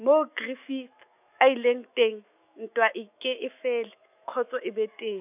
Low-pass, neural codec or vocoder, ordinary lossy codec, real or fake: 3.6 kHz; autoencoder, 48 kHz, 128 numbers a frame, DAC-VAE, trained on Japanese speech; none; fake